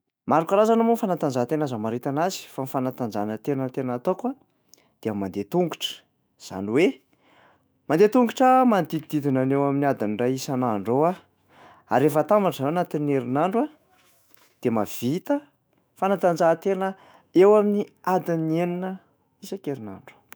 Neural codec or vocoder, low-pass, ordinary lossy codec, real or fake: autoencoder, 48 kHz, 128 numbers a frame, DAC-VAE, trained on Japanese speech; none; none; fake